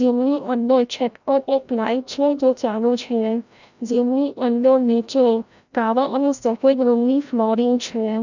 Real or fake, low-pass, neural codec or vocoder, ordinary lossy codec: fake; 7.2 kHz; codec, 16 kHz, 0.5 kbps, FreqCodec, larger model; none